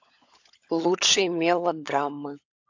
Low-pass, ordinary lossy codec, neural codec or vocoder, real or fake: 7.2 kHz; AAC, 48 kbps; codec, 16 kHz, 16 kbps, FunCodec, trained on LibriTTS, 50 frames a second; fake